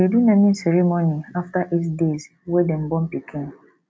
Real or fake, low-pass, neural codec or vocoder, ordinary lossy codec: real; none; none; none